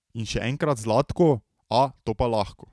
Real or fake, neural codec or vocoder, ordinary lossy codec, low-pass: real; none; none; none